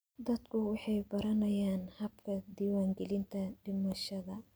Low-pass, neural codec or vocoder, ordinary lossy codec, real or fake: none; none; none; real